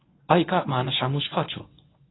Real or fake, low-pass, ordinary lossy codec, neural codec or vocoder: fake; 7.2 kHz; AAC, 16 kbps; codec, 24 kHz, 0.9 kbps, WavTokenizer, medium speech release version 1